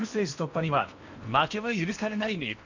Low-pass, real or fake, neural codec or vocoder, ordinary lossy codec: 7.2 kHz; fake; codec, 16 kHz in and 24 kHz out, 0.8 kbps, FocalCodec, streaming, 65536 codes; none